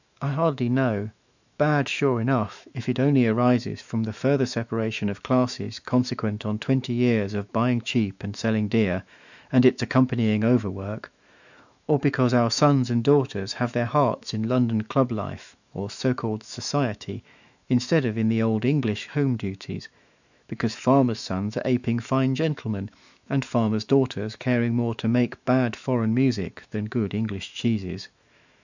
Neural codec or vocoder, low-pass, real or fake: autoencoder, 48 kHz, 128 numbers a frame, DAC-VAE, trained on Japanese speech; 7.2 kHz; fake